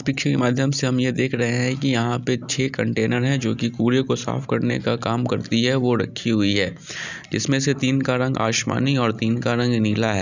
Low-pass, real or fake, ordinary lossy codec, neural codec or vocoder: 7.2 kHz; real; none; none